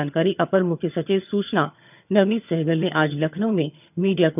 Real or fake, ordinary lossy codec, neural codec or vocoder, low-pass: fake; none; vocoder, 22.05 kHz, 80 mel bands, HiFi-GAN; 3.6 kHz